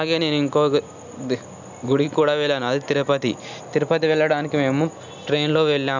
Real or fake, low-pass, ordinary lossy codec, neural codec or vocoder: real; 7.2 kHz; none; none